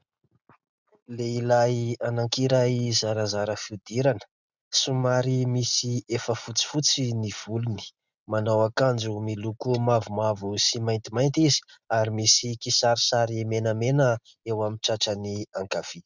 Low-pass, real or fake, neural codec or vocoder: 7.2 kHz; real; none